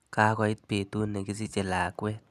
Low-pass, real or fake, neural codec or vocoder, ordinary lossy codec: 14.4 kHz; real; none; none